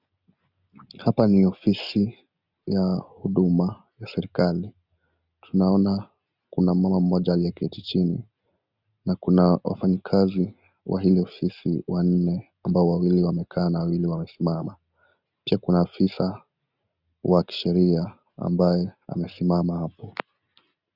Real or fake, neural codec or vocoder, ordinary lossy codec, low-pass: real; none; Opus, 64 kbps; 5.4 kHz